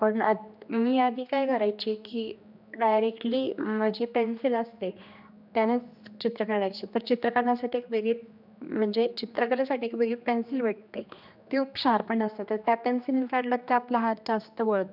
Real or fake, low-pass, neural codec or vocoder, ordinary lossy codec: fake; 5.4 kHz; codec, 16 kHz, 2 kbps, X-Codec, HuBERT features, trained on general audio; none